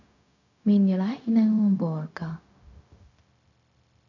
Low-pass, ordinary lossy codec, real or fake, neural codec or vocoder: 7.2 kHz; none; fake; codec, 16 kHz, 0.4 kbps, LongCat-Audio-Codec